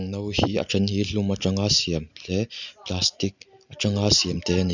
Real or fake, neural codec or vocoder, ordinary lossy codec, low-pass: real; none; none; 7.2 kHz